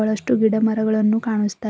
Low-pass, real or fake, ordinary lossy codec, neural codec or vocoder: none; real; none; none